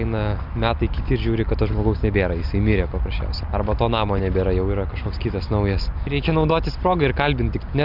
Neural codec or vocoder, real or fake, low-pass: none; real; 5.4 kHz